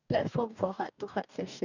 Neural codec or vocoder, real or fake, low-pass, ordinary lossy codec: codec, 44.1 kHz, 2.6 kbps, DAC; fake; 7.2 kHz; none